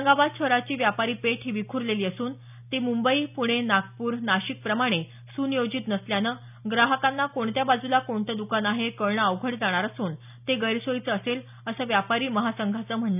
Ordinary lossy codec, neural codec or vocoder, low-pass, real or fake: none; none; 3.6 kHz; real